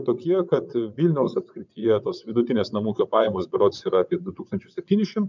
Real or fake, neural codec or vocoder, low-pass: fake; vocoder, 22.05 kHz, 80 mel bands, WaveNeXt; 7.2 kHz